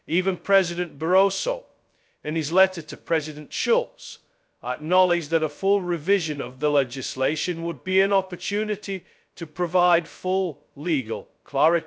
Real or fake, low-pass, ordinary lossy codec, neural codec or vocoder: fake; none; none; codec, 16 kHz, 0.2 kbps, FocalCodec